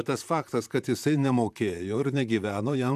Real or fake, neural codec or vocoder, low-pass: fake; vocoder, 44.1 kHz, 128 mel bands, Pupu-Vocoder; 14.4 kHz